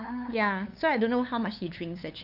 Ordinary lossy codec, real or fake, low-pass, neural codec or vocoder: none; fake; 5.4 kHz; codec, 16 kHz, 8 kbps, FunCodec, trained on LibriTTS, 25 frames a second